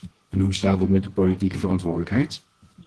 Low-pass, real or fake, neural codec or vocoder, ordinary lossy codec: 10.8 kHz; fake; codec, 24 kHz, 0.9 kbps, WavTokenizer, medium music audio release; Opus, 16 kbps